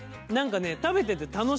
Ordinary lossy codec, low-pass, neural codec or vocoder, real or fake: none; none; none; real